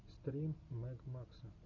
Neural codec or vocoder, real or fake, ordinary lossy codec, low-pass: none; real; MP3, 64 kbps; 7.2 kHz